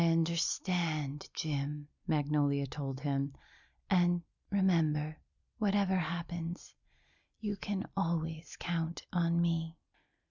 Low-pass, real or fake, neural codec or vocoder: 7.2 kHz; real; none